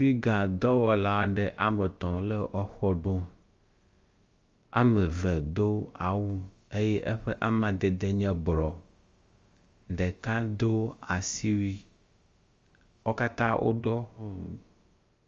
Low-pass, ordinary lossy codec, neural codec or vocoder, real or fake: 7.2 kHz; Opus, 32 kbps; codec, 16 kHz, about 1 kbps, DyCAST, with the encoder's durations; fake